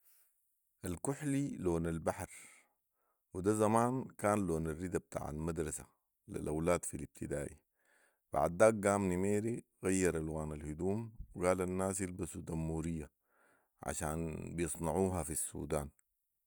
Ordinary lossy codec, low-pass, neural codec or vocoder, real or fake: none; none; none; real